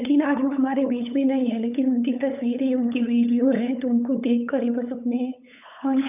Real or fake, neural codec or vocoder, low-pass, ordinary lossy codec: fake; codec, 16 kHz, 8 kbps, FunCodec, trained on LibriTTS, 25 frames a second; 3.6 kHz; none